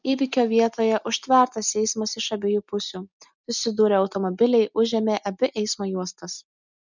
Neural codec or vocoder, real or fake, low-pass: none; real; 7.2 kHz